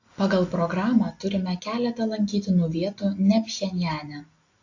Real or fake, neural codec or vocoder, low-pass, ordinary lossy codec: real; none; 7.2 kHz; AAC, 48 kbps